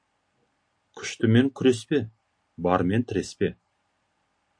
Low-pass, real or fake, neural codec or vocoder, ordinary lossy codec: 9.9 kHz; real; none; MP3, 64 kbps